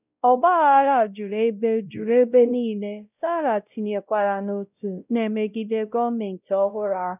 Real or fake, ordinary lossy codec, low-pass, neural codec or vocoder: fake; none; 3.6 kHz; codec, 16 kHz, 0.5 kbps, X-Codec, WavLM features, trained on Multilingual LibriSpeech